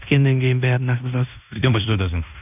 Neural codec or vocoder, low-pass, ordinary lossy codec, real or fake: codec, 16 kHz in and 24 kHz out, 0.9 kbps, LongCat-Audio-Codec, fine tuned four codebook decoder; 3.6 kHz; none; fake